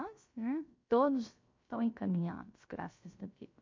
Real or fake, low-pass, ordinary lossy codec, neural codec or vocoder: fake; 7.2 kHz; MP3, 48 kbps; codec, 16 kHz, 0.3 kbps, FocalCodec